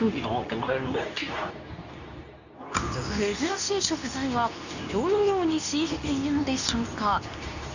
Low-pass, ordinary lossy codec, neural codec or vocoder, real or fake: 7.2 kHz; none; codec, 24 kHz, 0.9 kbps, WavTokenizer, medium speech release version 2; fake